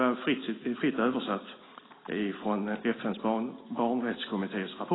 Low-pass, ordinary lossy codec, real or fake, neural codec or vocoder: 7.2 kHz; AAC, 16 kbps; real; none